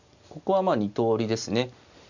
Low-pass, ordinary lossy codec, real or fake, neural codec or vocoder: 7.2 kHz; none; real; none